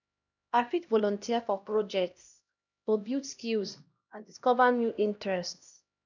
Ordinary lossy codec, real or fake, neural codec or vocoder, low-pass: none; fake; codec, 16 kHz, 1 kbps, X-Codec, HuBERT features, trained on LibriSpeech; 7.2 kHz